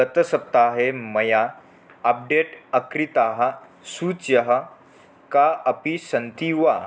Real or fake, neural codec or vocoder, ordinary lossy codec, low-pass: real; none; none; none